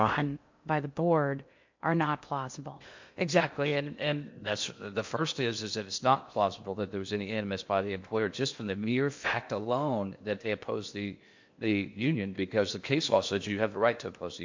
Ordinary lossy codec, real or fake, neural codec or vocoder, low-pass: MP3, 48 kbps; fake; codec, 16 kHz in and 24 kHz out, 0.8 kbps, FocalCodec, streaming, 65536 codes; 7.2 kHz